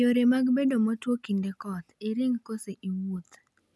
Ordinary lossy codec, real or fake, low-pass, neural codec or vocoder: none; real; none; none